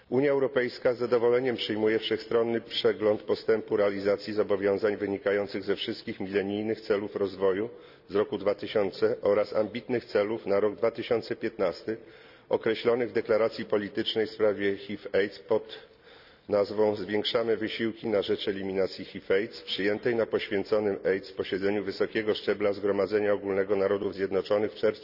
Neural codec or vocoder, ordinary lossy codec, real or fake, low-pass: none; none; real; 5.4 kHz